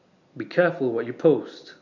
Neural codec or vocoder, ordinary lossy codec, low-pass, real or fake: none; none; 7.2 kHz; real